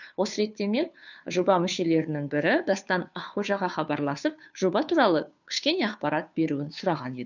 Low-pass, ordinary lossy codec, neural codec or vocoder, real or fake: 7.2 kHz; none; codec, 16 kHz, 2 kbps, FunCodec, trained on Chinese and English, 25 frames a second; fake